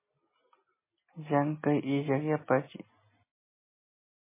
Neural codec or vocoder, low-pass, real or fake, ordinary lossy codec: none; 3.6 kHz; real; MP3, 16 kbps